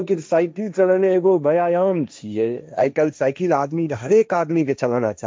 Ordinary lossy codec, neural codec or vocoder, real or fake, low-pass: none; codec, 16 kHz, 1.1 kbps, Voila-Tokenizer; fake; none